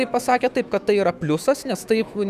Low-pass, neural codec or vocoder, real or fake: 14.4 kHz; autoencoder, 48 kHz, 128 numbers a frame, DAC-VAE, trained on Japanese speech; fake